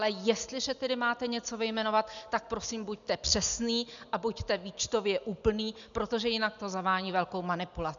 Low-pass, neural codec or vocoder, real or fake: 7.2 kHz; none; real